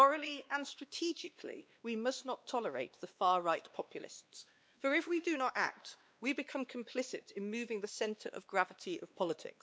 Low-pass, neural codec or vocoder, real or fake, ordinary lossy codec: none; codec, 16 kHz, 2 kbps, X-Codec, WavLM features, trained on Multilingual LibriSpeech; fake; none